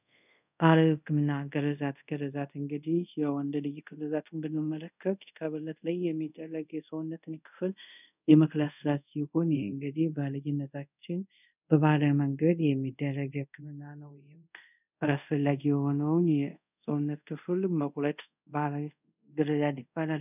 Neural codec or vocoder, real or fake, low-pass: codec, 24 kHz, 0.5 kbps, DualCodec; fake; 3.6 kHz